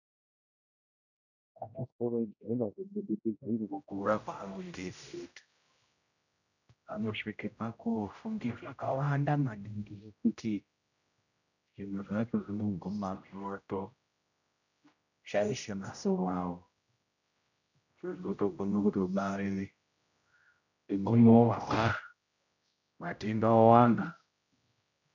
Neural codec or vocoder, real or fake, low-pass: codec, 16 kHz, 0.5 kbps, X-Codec, HuBERT features, trained on general audio; fake; 7.2 kHz